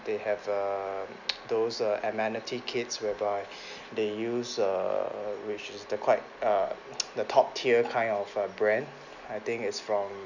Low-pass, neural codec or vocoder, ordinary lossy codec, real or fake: 7.2 kHz; none; none; real